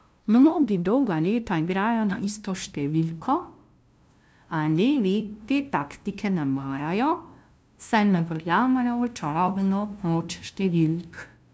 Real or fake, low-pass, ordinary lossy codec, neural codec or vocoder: fake; none; none; codec, 16 kHz, 0.5 kbps, FunCodec, trained on LibriTTS, 25 frames a second